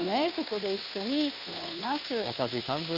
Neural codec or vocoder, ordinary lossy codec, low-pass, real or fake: codec, 16 kHz, 2 kbps, FunCodec, trained on Chinese and English, 25 frames a second; none; 5.4 kHz; fake